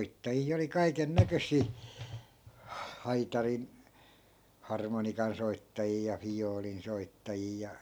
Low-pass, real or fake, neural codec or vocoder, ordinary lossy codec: none; real; none; none